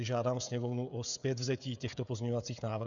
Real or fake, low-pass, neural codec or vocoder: fake; 7.2 kHz; codec, 16 kHz, 16 kbps, FreqCodec, smaller model